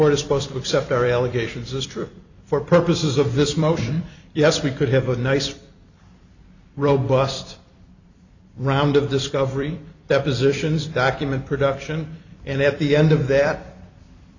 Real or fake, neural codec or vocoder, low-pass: fake; vocoder, 44.1 kHz, 128 mel bands every 256 samples, BigVGAN v2; 7.2 kHz